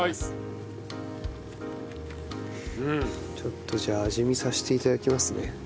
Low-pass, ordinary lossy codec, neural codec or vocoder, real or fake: none; none; none; real